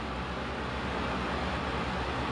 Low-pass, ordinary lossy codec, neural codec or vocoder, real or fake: 9.9 kHz; AAC, 48 kbps; none; real